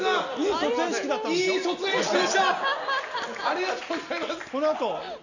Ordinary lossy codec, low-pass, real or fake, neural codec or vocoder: none; 7.2 kHz; real; none